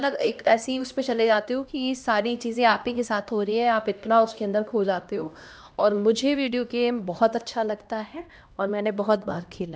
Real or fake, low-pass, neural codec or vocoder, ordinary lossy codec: fake; none; codec, 16 kHz, 1 kbps, X-Codec, HuBERT features, trained on LibriSpeech; none